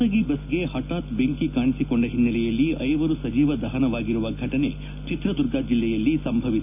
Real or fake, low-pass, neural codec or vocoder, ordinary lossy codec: real; 3.6 kHz; none; none